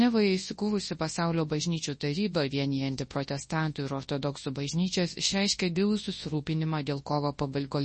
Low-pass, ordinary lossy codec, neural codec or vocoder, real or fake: 10.8 kHz; MP3, 32 kbps; codec, 24 kHz, 0.9 kbps, WavTokenizer, large speech release; fake